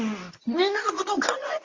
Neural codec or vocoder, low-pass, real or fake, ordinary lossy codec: codec, 24 kHz, 0.9 kbps, WavTokenizer, medium speech release version 2; 7.2 kHz; fake; Opus, 32 kbps